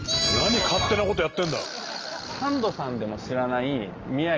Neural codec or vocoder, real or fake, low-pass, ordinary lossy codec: none; real; 7.2 kHz; Opus, 24 kbps